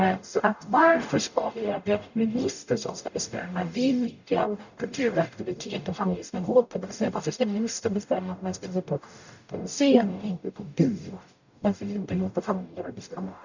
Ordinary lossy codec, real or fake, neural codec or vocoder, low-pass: none; fake; codec, 44.1 kHz, 0.9 kbps, DAC; 7.2 kHz